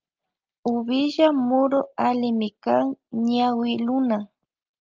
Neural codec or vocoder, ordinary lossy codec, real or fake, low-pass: none; Opus, 24 kbps; real; 7.2 kHz